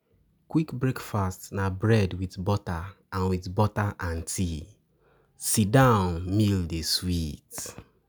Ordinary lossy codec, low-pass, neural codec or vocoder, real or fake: none; none; none; real